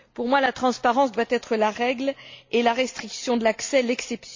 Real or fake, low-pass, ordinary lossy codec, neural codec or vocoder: real; 7.2 kHz; MP3, 48 kbps; none